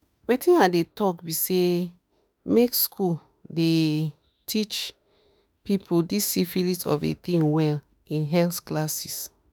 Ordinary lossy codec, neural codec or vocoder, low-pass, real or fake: none; autoencoder, 48 kHz, 32 numbers a frame, DAC-VAE, trained on Japanese speech; none; fake